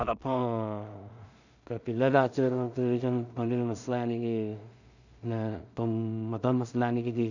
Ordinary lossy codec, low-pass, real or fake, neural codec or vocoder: none; 7.2 kHz; fake; codec, 16 kHz in and 24 kHz out, 0.4 kbps, LongCat-Audio-Codec, two codebook decoder